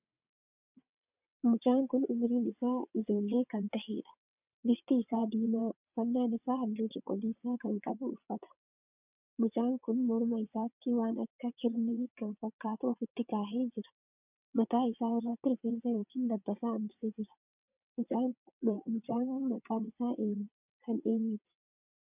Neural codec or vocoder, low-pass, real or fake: vocoder, 22.05 kHz, 80 mel bands, WaveNeXt; 3.6 kHz; fake